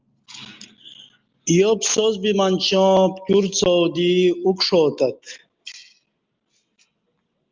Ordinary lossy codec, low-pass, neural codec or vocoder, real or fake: Opus, 32 kbps; 7.2 kHz; none; real